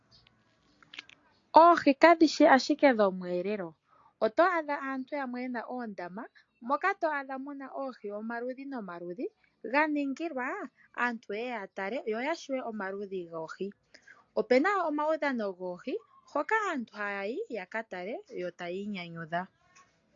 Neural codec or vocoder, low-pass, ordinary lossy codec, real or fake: none; 7.2 kHz; AAC, 48 kbps; real